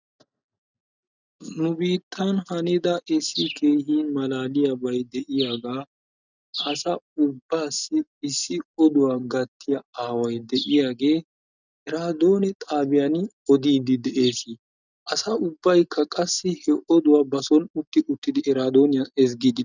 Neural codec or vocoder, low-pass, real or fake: none; 7.2 kHz; real